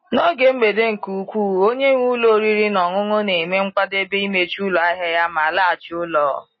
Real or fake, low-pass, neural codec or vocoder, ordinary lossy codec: real; 7.2 kHz; none; MP3, 24 kbps